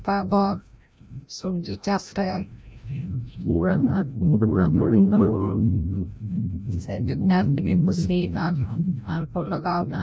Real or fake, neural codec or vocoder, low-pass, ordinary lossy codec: fake; codec, 16 kHz, 0.5 kbps, FreqCodec, larger model; none; none